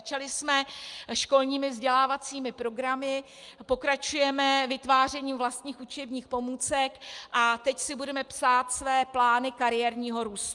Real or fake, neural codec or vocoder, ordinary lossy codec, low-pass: real; none; Opus, 32 kbps; 10.8 kHz